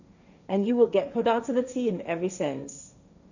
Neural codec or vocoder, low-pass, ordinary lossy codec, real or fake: codec, 16 kHz, 1.1 kbps, Voila-Tokenizer; 7.2 kHz; none; fake